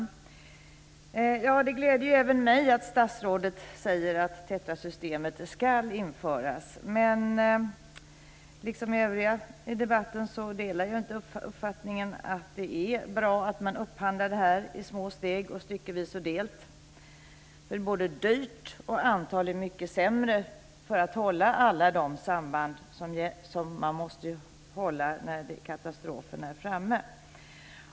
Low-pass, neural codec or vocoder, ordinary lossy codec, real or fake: none; none; none; real